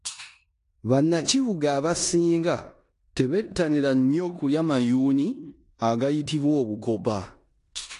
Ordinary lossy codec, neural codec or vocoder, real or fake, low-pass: AAC, 48 kbps; codec, 16 kHz in and 24 kHz out, 0.9 kbps, LongCat-Audio-Codec, four codebook decoder; fake; 10.8 kHz